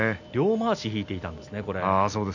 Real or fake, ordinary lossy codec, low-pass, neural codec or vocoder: real; none; 7.2 kHz; none